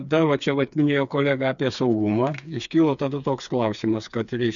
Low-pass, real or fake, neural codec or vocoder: 7.2 kHz; fake; codec, 16 kHz, 4 kbps, FreqCodec, smaller model